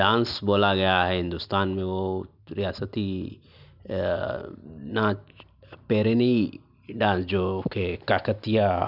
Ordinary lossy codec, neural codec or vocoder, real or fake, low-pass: none; none; real; 5.4 kHz